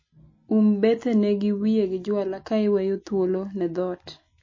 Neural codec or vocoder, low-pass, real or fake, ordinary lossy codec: none; 7.2 kHz; real; MP3, 32 kbps